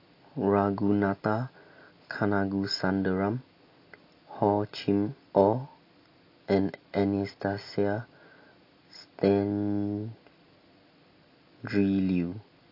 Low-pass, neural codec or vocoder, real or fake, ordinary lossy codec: 5.4 kHz; none; real; AAC, 32 kbps